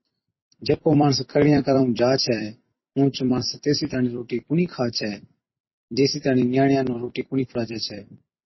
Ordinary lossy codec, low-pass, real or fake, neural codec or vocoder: MP3, 24 kbps; 7.2 kHz; fake; vocoder, 44.1 kHz, 128 mel bands every 256 samples, BigVGAN v2